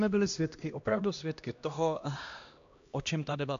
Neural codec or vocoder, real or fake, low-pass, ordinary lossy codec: codec, 16 kHz, 1 kbps, X-Codec, HuBERT features, trained on LibriSpeech; fake; 7.2 kHz; AAC, 64 kbps